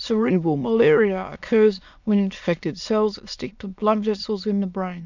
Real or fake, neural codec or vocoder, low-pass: fake; autoencoder, 22.05 kHz, a latent of 192 numbers a frame, VITS, trained on many speakers; 7.2 kHz